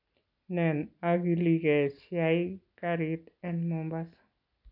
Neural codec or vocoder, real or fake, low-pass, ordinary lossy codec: none; real; 5.4 kHz; none